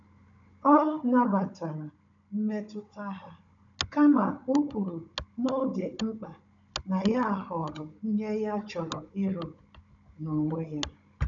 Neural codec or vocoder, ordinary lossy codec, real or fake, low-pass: codec, 16 kHz, 16 kbps, FunCodec, trained on Chinese and English, 50 frames a second; none; fake; 7.2 kHz